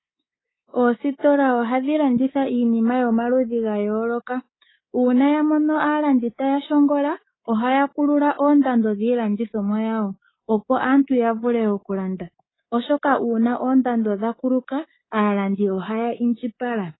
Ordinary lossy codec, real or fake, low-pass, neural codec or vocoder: AAC, 16 kbps; fake; 7.2 kHz; codec, 24 kHz, 3.1 kbps, DualCodec